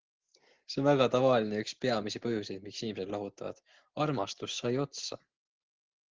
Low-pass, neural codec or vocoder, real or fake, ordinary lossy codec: 7.2 kHz; none; real; Opus, 16 kbps